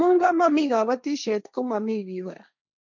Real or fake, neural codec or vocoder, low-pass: fake; codec, 16 kHz, 1.1 kbps, Voila-Tokenizer; 7.2 kHz